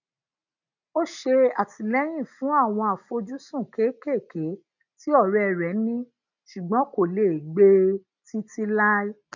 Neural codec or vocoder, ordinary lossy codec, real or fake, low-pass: none; none; real; 7.2 kHz